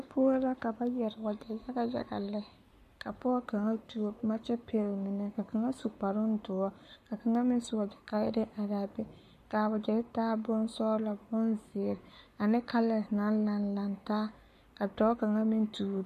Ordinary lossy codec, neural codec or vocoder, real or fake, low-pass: MP3, 64 kbps; codec, 44.1 kHz, 7.8 kbps, Pupu-Codec; fake; 14.4 kHz